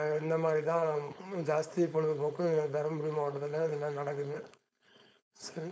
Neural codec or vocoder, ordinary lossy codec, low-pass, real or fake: codec, 16 kHz, 4.8 kbps, FACodec; none; none; fake